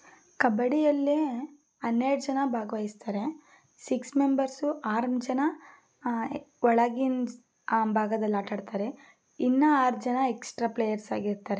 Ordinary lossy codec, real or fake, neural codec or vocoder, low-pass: none; real; none; none